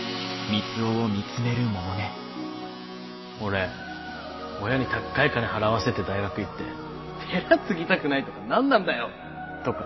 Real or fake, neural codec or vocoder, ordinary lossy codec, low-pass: real; none; MP3, 24 kbps; 7.2 kHz